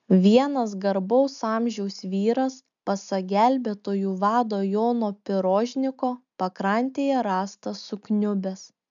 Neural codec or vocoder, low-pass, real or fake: none; 7.2 kHz; real